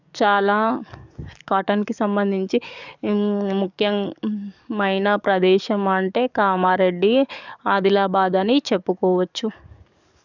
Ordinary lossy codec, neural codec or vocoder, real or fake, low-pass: none; codec, 44.1 kHz, 7.8 kbps, DAC; fake; 7.2 kHz